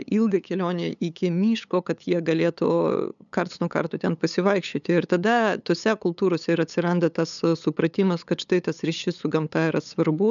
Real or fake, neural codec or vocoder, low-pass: fake; codec, 16 kHz, 8 kbps, FunCodec, trained on LibriTTS, 25 frames a second; 7.2 kHz